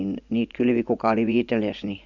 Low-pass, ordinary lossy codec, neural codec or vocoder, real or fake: 7.2 kHz; none; vocoder, 24 kHz, 100 mel bands, Vocos; fake